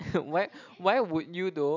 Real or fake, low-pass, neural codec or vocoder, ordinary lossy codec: real; 7.2 kHz; none; none